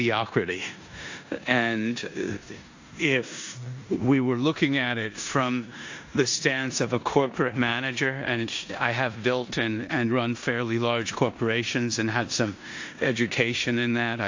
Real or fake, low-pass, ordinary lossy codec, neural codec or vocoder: fake; 7.2 kHz; AAC, 48 kbps; codec, 16 kHz in and 24 kHz out, 0.9 kbps, LongCat-Audio-Codec, four codebook decoder